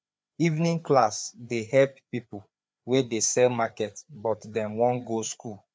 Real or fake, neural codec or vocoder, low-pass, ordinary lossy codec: fake; codec, 16 kHz, 4 kbps, FreqCodec, larger model; none; none